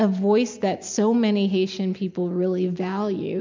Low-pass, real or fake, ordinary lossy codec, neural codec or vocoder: 7.2 kHz; real; MP3, 64 kbps; none